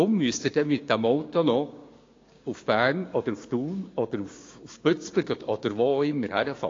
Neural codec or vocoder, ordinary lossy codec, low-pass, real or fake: codec, 16 kHz, 6 kbps, DAC; AAC, 32 kbps; 7.2 kHz; fake